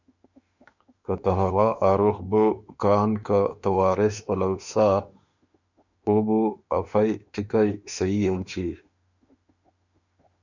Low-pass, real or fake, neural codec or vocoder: 7.2 kHz; fake; autoencoder, 48 kHz, 32 numbers a frame, DAC-VAE, trained on Japanese speech